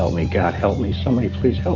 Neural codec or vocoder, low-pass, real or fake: none; 7.2 kHz; real